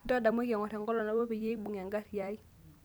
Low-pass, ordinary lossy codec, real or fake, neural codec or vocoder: none; none; fake; vocoder, 44.1 kHz, 128 mel bands every 256 samples, BigVGAN v2